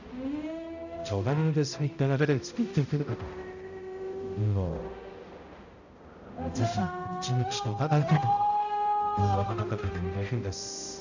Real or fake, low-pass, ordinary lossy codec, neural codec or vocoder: fake; 7.2 kHz; none; codec, 16 kHz, 0.5 kbps, X-Codec, HuBERT features, trained on balanced general audio